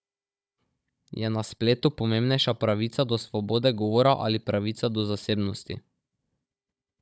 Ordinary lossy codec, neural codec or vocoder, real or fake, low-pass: none; codec, 16 kHz, 16 kbps, FunCodec, trained on Chinese and English, 50 frames a second; fake; none